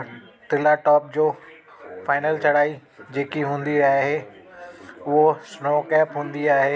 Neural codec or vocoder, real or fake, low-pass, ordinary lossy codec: none; real; none; none